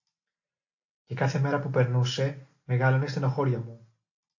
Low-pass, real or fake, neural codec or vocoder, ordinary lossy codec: 7.2 kHz; real; none; AAC, 48 kbps